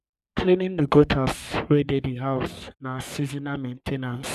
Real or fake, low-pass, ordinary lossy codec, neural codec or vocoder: fake; 14.4 kHz; none; codec, 44.1 kHz, 3.4 kbps, Pupu-Codec